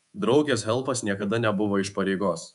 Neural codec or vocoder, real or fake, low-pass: codec, 24 kHz, 3.1 kbps, DualCodec; fake; 10.8 kHz